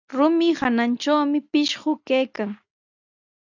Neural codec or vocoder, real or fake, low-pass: none; real; 7.2 kHz